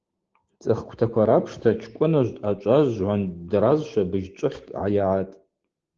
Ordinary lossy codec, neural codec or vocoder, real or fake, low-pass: Opus, 16 kbps; codec, 16 kHz, 6 kbps, DAC; fake; 7.2 kHz